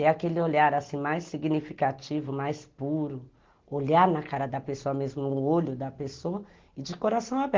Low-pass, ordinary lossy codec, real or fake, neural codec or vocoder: 7.2 kHz; Opus, 16 kbps; real; none